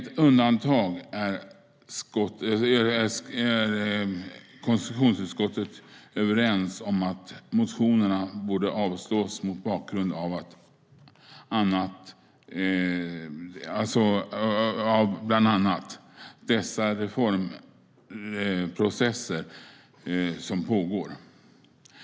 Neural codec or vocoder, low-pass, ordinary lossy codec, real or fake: none; none; none; real